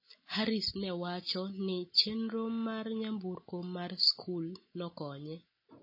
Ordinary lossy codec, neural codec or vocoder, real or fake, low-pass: MP3, 24 kbps; none; real; 5.4 kHz